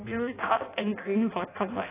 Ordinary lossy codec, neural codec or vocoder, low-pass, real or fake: AAC, 16 kbps; codec, 16 kHz in and 24 kHz out, 0.6 kbps, FireRedTTS-2 codec; 3.6 kHz; fake